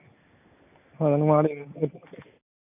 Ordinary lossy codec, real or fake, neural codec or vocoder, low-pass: none; real; none; 3.6 kHz